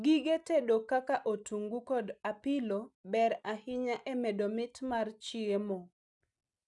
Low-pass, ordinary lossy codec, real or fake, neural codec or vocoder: 10.8 kHz; none; real; none